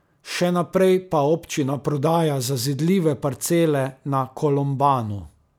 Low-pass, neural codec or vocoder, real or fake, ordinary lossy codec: none; none; real; none